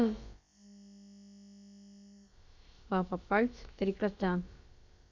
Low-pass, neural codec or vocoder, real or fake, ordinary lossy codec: 7.2 kHz; codec, 16 kHz, about 1 kbps, DyCAST, with the encoder's durations; fake; Opus, 64 kbps